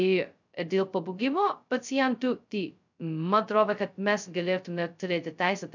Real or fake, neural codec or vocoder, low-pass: fake; codec, 16 kHz, 0.2 kbps, FocalCodec; 7.2 kHz